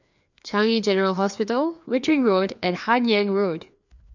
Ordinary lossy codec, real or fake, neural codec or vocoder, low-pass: none; fake; codec, 16 kHz, 2 kbps, FreqCodec, larger model; 7.2 kHz